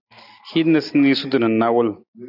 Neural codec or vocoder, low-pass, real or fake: none; 5.4 kHz; real